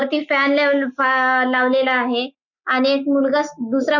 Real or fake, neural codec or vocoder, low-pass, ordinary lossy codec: real; none; 7.2 kHz; none